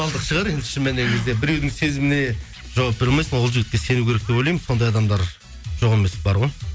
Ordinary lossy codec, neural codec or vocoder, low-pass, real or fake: none; none; none; real